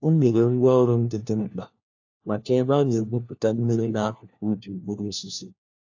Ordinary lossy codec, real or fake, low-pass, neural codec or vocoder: AAC, 48 kbps; fake; 7.2 kHz; codec, 16 kHz, 1 kbps, FunCodec, trained on LibriTTS, 50 frames a second